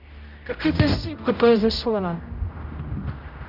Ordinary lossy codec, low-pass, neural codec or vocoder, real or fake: none; 5.4 kHz; codec, 16 kHz, 0.5 kbps, X-Codec, HuBERT features, trained on general audio; fake